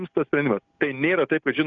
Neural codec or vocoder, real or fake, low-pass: none; real; 7.2 kHz